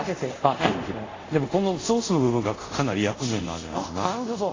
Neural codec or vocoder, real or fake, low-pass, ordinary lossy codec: codec, 24 kHz, 0.5 kbps, DualCodec; fake; 7.2 kHz; AAC, 32 kbps